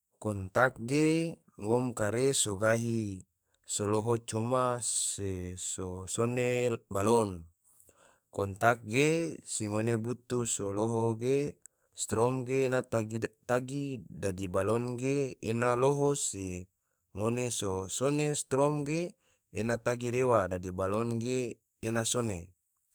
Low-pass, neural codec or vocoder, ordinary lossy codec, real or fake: none; codec, 44.1 kHz, 2.6 kbps, SNAC; none; fake